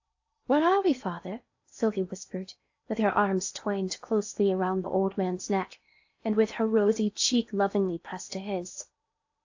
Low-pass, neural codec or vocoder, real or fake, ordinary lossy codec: 7.2 kHz; codec, 16 kHz in and 24 kHz out, 0.8 kbps, FocalCodec, streaming, 65536 codes; fake; AAC, 48 kbps